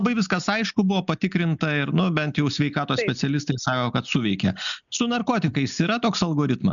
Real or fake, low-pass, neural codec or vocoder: real; 7.2 kHz; none